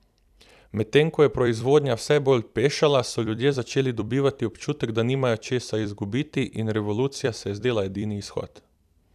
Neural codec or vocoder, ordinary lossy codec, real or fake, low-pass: vocoder, 44.1 kHz, 128 mel bands every 256 samples, BigVGAN v2; none; fake; 14.4 kHz